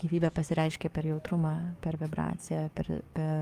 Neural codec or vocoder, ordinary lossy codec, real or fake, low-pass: codec, 44.1 kHz, 7.8 kbps, DAC; Opus, 32 kbps; fake; 14.4 kHz